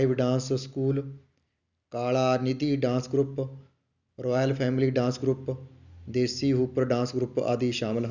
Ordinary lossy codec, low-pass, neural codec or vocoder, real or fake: none; 7.2 kHz; none; real